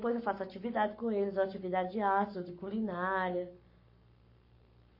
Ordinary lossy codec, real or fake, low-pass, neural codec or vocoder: MP3, 32 kbps; fake; 5.4 kHz; codec, 44.1 kHz, 7.8 kbps, Pupu-Codec